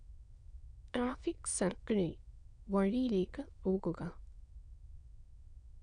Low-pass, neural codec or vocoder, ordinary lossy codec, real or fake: 9.9 kHz; autoencoder, 22.05 kHz, a latent of 192 numbers a frame, VITS, trained on many speakers; none; fake